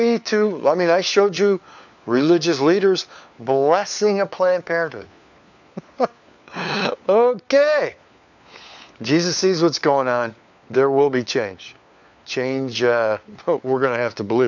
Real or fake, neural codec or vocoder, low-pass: fake; codec, 16 kHz, 4 kbps, FunCodec, trained on LibriTTS, 50 frames a second; 7.2 kHz